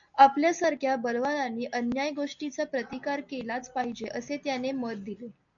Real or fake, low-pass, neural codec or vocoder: real; 7.2 kHz; none